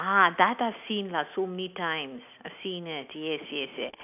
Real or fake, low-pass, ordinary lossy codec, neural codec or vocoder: real; 3.6 kHz; none; none